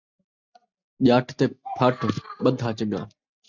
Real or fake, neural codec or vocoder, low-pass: real; none; 7.2 kHz